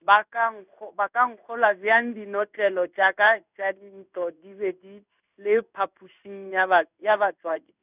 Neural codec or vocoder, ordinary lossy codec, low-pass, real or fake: codec, 16 kHz in and 24 kHz out, 1 kbps, XY-Tokenizer; none; 3.6 kHz; fake